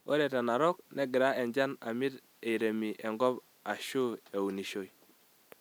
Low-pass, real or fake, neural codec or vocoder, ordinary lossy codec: none; real; none; none